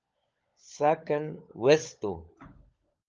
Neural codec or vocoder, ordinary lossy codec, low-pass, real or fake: codec, 16 kHz, 16 kbps, FunCodec, trained on LibriTTS, 50 frames a second; Opus, 24 kbps; 7.2 kHz; fake